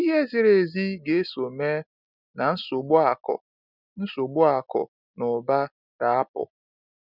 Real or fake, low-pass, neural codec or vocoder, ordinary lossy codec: real; 5.4 kHz; none; none